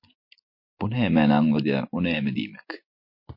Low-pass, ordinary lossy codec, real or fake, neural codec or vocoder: 5.4 kHz; MP3, 32 kbps; real; none